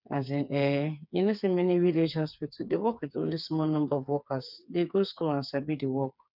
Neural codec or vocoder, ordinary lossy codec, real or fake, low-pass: codec, 16 kHz, 4 kbps, FreqCodec, smaller model; none; fake; 5.4 kHz